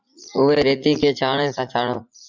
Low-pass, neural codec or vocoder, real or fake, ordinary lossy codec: 7.2 kHz; vocoder, 44.1 kHz, 80 mel bands, Vocos; fake; MP3, 64 kbps